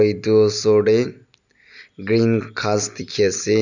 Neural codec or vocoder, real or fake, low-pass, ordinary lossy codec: none; real; 7.2 kHz; none